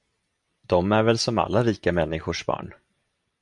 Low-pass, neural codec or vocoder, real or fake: 10.8 kHz; none; real